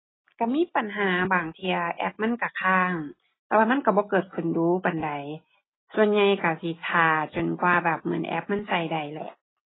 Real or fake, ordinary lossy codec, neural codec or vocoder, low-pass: real; AAC, 16 kbps; none; 7.2 kHz